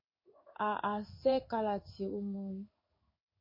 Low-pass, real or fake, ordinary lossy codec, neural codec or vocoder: 5.4 kHz; fake; MP3, 24 kbps; codec, 16 kHz in and 24 kHz out, 1 kbps, XY-Tokenizer